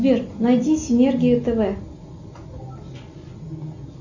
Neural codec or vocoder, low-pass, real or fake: none; 7.2 kHz; real